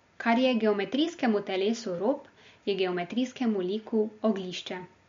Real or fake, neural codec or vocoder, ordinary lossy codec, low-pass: real; none; MP3, 48 kbps; 7.2 kHz